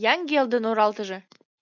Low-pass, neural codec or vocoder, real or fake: 7.2 kHz; none; real